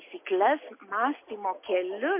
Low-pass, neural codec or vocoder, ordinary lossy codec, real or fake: 3.6 kHz; none; MP3, 24 kbps; real